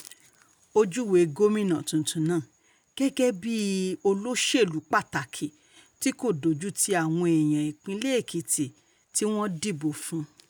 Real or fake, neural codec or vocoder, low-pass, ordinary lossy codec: real; none; none; none